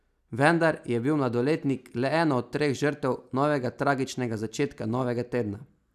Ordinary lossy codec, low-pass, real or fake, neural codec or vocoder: none; 14.4 kHz; real; none